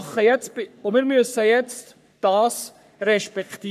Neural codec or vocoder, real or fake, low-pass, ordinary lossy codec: codec, 44.1 kHz, 3.4 kbps, Pupu-Codec; fake; 14.4 kHz; none